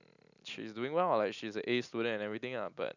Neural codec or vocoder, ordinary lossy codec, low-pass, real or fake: none; none; 7.2 kHz; real